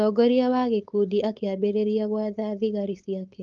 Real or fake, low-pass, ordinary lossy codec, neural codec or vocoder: fake; 7.2 kHz; Opus, 32 kbps; codec, 16 kHz, 4.8 kbps, FACodec